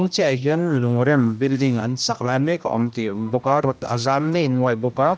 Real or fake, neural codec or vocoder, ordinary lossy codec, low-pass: fake; codec, 16 kHz, 1 kbps, X-Codec, HuBERT features, trained on general audio; none; none